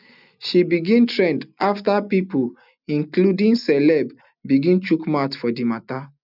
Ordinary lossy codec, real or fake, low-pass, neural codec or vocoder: none; real; 5.4 kHz; none